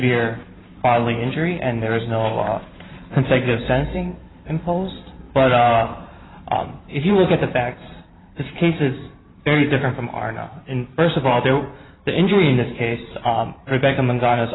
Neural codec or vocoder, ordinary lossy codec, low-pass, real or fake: vocoder, 44.1 kHz, 128 mel bands every 512 samples, BigVGAN v2; AAC, 16 kbps; 7.2 kHz; fake